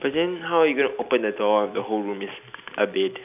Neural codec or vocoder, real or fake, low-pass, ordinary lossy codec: none; real; 3.6 kHz; none